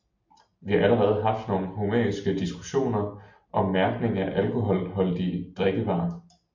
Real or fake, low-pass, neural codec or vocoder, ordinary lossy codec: real; 7.2 kHz; none; MP3, 48 kbps